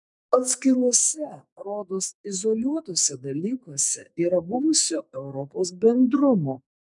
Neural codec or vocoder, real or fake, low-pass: codec, 44.1 kHz, 2.6 kbps, SNAC; fake; 10.8 kHz